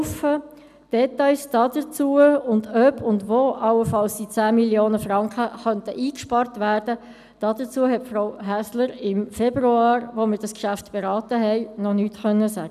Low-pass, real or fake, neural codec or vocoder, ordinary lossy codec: 14.4 kHz; real; none; none